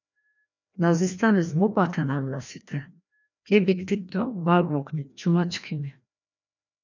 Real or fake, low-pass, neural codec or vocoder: fake; 7.2 kHz; codec, 16 kHz, 1 kbps, FreqCodec, larger model